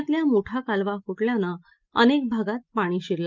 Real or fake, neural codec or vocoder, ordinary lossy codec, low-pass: real; none; Opus, 24 kbps; 7.2 kHz